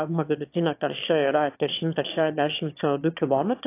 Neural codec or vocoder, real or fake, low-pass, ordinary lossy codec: autoencoder, 22.05 kHz, a latent of 192 numbers a frame, VITS, trained on one speaker; fake; 3.6 kHz; AAC, 24 kbps